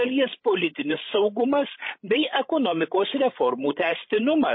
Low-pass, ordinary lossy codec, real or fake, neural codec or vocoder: 7.2 kHz; MP3, 24 kbps; fake; codec, 16 kHz, 16 kbps, FreqCodec, larger model